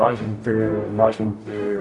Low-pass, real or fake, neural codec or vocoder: 10.8 kHz; fake; codec, 44.1 kHz, 0.9 kbps, DAC